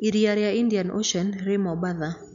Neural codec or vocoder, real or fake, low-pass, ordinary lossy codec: none; real; 7.2 kHz; none